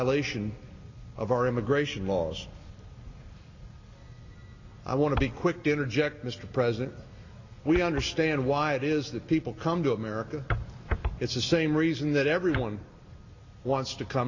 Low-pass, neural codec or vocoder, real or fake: 7.2 kHz; none; real